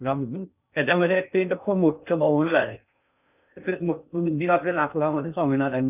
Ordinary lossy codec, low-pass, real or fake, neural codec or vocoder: none; 3.6 kHz; fake; codec, 16 kHz in and 24 kHz out, 0.6 kbps, FocalCodec, streaming, 2048 codes